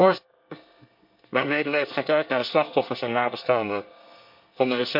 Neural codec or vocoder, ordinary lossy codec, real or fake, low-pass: codec, 24 kHz, 1 kbps, SNAC; MP3, 48 kbps; fake; 5.4 kHz